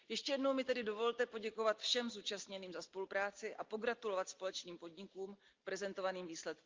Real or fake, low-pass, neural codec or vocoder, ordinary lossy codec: real; 7.2 kHz; none; Opus, 32 kbps